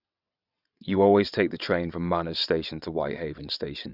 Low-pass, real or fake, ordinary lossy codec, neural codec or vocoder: 5.4 kHz; fake; none; vocoder, 22.05 kHz, 80 mel bands, WaveNeXt